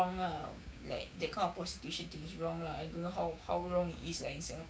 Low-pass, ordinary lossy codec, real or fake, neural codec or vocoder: none; none; fake; codec, 16 kHz, 6 kbps, DAC